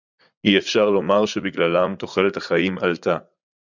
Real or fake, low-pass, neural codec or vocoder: fake; 7.2 kHz; vocoder, 44.1 kHz, 80 mel bands, Vocos